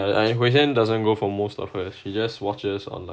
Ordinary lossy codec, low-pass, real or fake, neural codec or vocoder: none; none; real; none